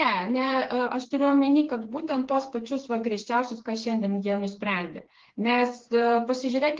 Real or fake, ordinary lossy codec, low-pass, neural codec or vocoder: fake; Opus, 16 kbps; 7.2 kHz; codec, 16 kHz, 4 kbps, FreqCodec, smaller model